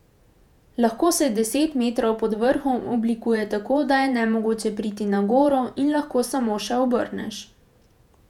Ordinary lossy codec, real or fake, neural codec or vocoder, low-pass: none; fake; vocoder, 48 kHz, 128 mel bands, Vocos; 19.8 kHz